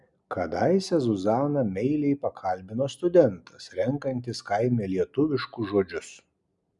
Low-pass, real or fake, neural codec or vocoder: 10.8 kHz; real; none